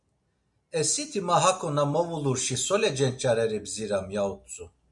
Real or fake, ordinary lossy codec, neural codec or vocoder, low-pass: real; MP3, 96 kbps; none; 10.8 kHz